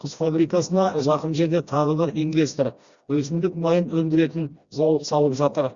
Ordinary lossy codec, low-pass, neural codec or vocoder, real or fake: Opus, 64 kbps; 7.2 kHz; codec, 16 kHz, 1 kbps, FreqCodec, smaller model; fake